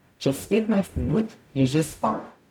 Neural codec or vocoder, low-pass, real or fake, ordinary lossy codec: codec, 44.1 kHz, 0.9 kbps, DAC; 19.8 kHz; fake; none